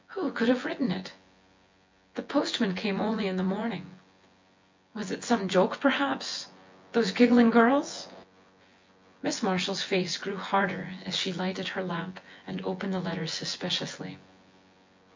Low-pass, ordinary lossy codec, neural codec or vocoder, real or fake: 7.2 kHz; MP3, 48 kbps; vocoder, 24 kHz, 100 mel bands, Vocos; fake